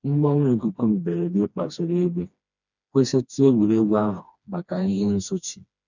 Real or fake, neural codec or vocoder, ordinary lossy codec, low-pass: fake; codec, 16 kHz, 2 kbps, FreqCodec, smaller model; none; 7.2 kHz